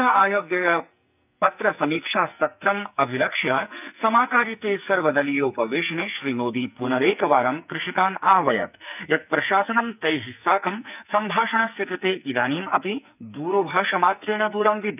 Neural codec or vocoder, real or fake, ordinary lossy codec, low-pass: codec, 44.1 kHz, 2.6 kbps, SNAC; fake; none; 3.6 kHz